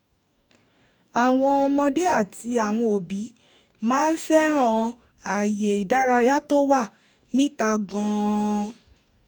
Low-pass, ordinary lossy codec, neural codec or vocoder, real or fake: 19.8 kHz; none; codec, 44.1 kHz, 2.6 kbps, DAC; fake